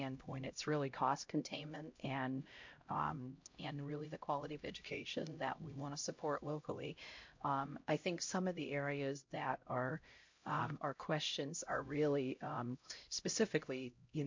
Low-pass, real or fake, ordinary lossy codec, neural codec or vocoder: 7.2 kHz; fake; MP3, 48 kbps; codec, 16 kHz, 0.5 kbps, X-Codec, HuBERT features, trained on LibriSpeech